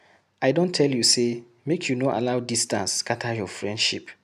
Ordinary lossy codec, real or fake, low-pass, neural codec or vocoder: none; real; 14.4 kHz; none